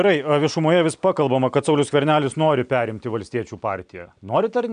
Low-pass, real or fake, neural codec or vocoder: 9.9 kHz; real; none